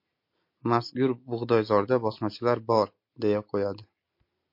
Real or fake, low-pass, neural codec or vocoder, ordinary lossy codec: real; 5.4 kHz; none; MP3, 32 kbps